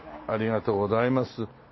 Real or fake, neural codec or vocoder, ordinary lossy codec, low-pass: real; none; MP3, 24 kbps; 7.2 kHz